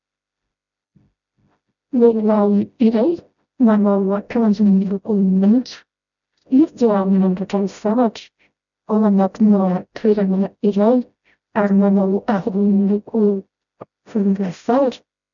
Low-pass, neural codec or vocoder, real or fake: 7.2 kHz; codec, 16 kHz, 0.5 kbps, FreqCodec, smaller model; fake